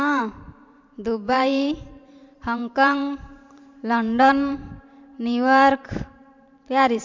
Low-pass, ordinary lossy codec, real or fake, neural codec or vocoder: 7.2 kHz; MP3, 64 kbps; fake; vocoder, 44.1 kHz, 128 mel bands every 512 samples, BigVGAN v2